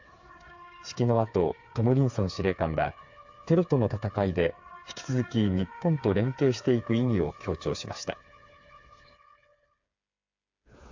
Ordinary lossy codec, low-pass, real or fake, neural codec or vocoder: AAC, 48 kbps; 7.2 kHz; fake; codec, 16 kHz, 4 kbps, FreqCodec, smaller model